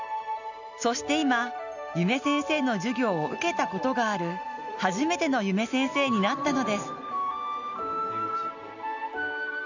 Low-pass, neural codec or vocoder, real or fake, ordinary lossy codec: 7.2 kHz; none; real; none